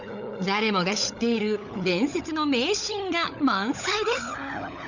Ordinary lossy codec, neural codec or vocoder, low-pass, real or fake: none; codec, 16 kHz, 16 kbps, FunCodec, trained on LibriTTS, 50 frames a second; 7.2 kHz; fake